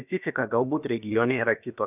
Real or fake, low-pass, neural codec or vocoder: fake; 3.6 kHz; codec, 16 kHz, about 1 kbps, DyCAST, with the encoder's durations